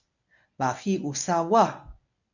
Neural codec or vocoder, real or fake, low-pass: codec, 24 kHz, 0.9 kbps, WavTokenizer, medium speech release version 1; fake; 7.2 kHz